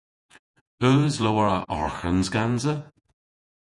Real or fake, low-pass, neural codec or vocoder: fake; 10.8 kHz; vocoder, 48 kHz, 128 mel bands, Vocos